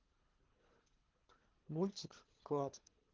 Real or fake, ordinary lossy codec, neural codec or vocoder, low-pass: fake; Opus, 16 kbps; codec, 24 kHz, 3 kbps, HILCodec; 7.2 kHz